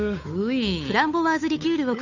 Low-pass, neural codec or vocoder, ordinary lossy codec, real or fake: 7.2 kHz; codec, 16 kHz, 8 kbps, FunCodec, trained on Chinese and English, 25 frames a second; none; fake